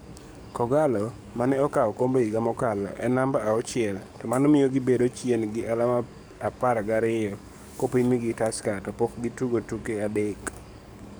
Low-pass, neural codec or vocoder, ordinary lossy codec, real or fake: none; codec, 44.1 kHz, 7.8 kbps, DAC; none; fake